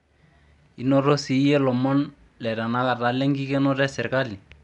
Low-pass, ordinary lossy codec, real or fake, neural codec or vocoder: 10.8 kHz; none; real; none